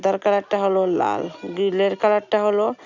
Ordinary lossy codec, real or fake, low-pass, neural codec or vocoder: none; real; 7.2 kHz; none